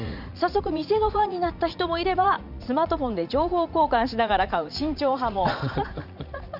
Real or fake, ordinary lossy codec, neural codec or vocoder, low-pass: fake; none; vocoder, 44.1 kHz, 80 mel bands, Vocos; 5.4 kHz